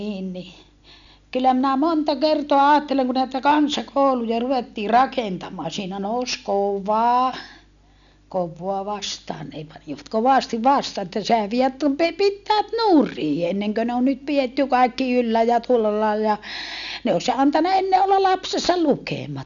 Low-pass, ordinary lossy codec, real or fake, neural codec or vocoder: 7.2 kHz; none; real; none